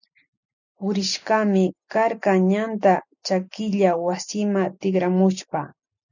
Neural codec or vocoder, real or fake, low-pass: none; real; 7.2 kHz